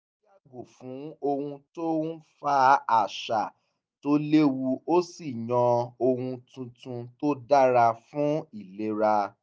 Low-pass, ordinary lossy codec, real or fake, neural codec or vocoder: none; none; real; none